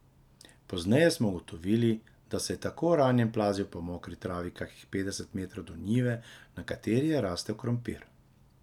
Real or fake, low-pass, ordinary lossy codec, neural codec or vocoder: real; 19.8 kHz; none; none